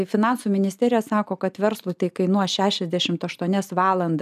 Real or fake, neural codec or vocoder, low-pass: real; none; 14.4 kHz